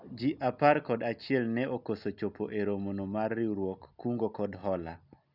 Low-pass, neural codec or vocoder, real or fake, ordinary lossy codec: 5.4 kHz; none; real; none